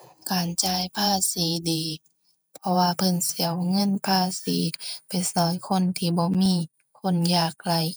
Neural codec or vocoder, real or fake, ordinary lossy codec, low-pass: vocoder, 48 kHz, 128 mel bands, Vocos; fake; none; none